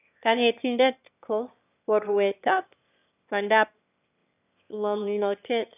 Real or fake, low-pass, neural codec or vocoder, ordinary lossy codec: fake; 3.6 kHz; autoencoder, 22.05 kHz, a latent of 192 numbers a frame, VITS, trained on one speaker; none